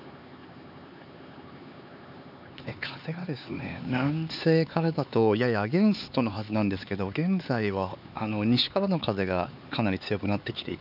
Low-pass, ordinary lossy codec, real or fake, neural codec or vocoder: 5.4 kHz; none; fake; codec, 16 kHz, 4 kbps, X-Codec, HuBERT features, trained on LibriSpeech